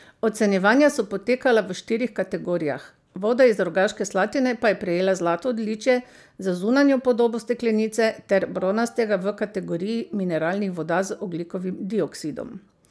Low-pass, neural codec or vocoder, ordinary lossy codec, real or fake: none; none; none; real